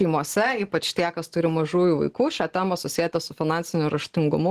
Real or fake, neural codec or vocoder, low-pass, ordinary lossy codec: real; none; 14.4 kHz; Opus, 16 kbps